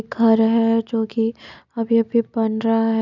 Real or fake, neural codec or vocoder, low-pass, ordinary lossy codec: real; none; 7.2 kHz; none